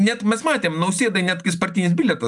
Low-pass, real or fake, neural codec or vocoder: 10.8 kHz; real; none